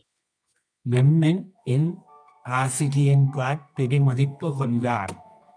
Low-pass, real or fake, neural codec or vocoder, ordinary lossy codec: 9.9 kHz; fake; codec, 24 kHz, 0.9 kbps, WavTokenizer, medium music audio release; MP3, 96 kbps